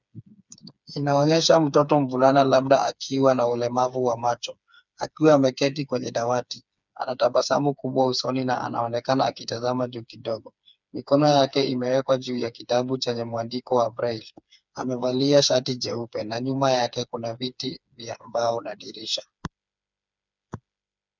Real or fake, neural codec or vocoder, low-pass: fake; codec, 16 kHz, 4 kbps, FreqCodec, smaller model; 7.2 kHz